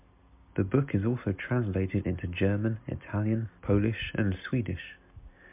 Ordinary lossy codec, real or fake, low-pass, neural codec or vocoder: MP3, 32 kbps; real; 3.6 kHz; none